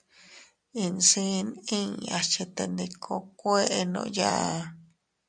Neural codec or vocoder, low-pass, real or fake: none; 9.9 kHz; real